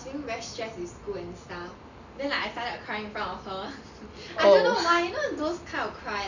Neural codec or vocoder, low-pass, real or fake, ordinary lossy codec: none; 7.2 kHz; real; none